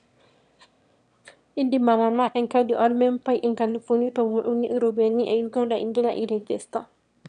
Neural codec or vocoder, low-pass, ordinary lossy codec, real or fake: autoencoder, 22.05 kHz, a latent of 192 numbers a frame, VITS, trained on one speaker; 9.9 kHz; none; fake